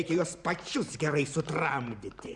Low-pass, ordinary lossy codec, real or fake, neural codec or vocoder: 10.8 kHz; Opus, 64 kbps; fake; vocoder, 44.1 kHz, 128 mel bands every 512 samples, BigVGAN v2